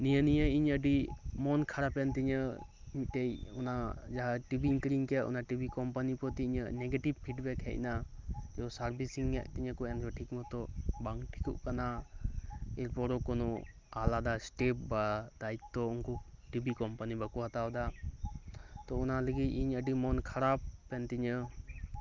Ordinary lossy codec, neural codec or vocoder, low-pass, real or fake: Opus, 24 kbps; none; 7.2 kHz; real